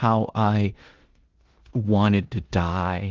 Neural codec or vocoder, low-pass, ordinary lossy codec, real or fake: codec, 16 kHz in and 24 kHz out, 0.9 kbps, LongCat-Audio-Codec, four codebook decoder; 7.2 kHz; Opus, 16 kbps; fake